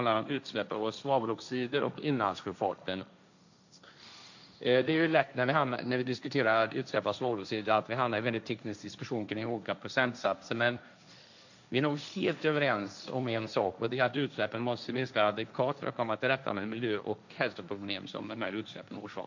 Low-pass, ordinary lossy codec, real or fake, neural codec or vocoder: 7.2 kHz; none; fake; codec, 16 kHz, 1.1 kbps, Voila-Tokenizer